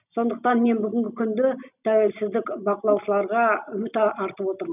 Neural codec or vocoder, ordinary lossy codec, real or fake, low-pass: none; none; real; 3.6 kHz